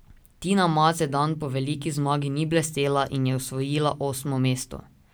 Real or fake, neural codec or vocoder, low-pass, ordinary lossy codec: real; none; none; none